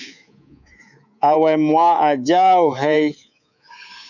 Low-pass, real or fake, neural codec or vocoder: 7.2 kHz; fake; codec, 24 kHz, 3.1 kbps, DualCodec